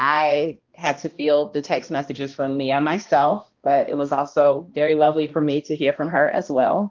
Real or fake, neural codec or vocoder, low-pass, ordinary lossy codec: fake; codec, 16 kHz, 1 kbps, X-Codec, HuBERT features, trained on balanced general audio; 7.2 kHz; Opus, 32 kbps